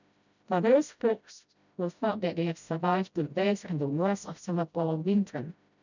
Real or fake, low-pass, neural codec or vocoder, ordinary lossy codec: fake; 7.2 kHz; codec, 16 kHz, 0.5 kbps, FreqCodec, smaller model; none